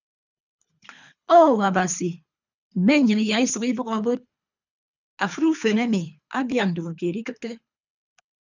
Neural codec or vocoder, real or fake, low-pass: codec, 24 kHz, 3 kbps, HILCodec; fake; 7.2 kHz